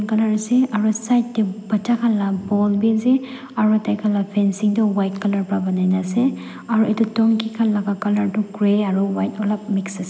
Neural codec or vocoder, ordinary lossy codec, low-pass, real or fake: none; none; none; real